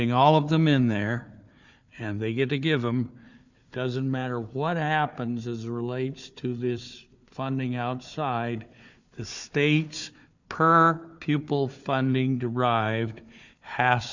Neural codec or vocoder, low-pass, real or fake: codec, 16 kHz, 4 kbps, FunCodec, trained on Chinese and English, 50 frames a second; 7.2 kHz; fake